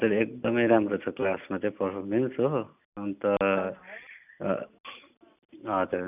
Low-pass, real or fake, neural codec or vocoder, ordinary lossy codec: 3.6 kHz; real; none; none